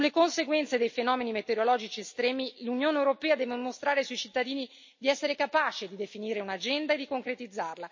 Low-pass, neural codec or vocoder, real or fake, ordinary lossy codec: 7.2 kHz; none; real; MP3, 32 kbps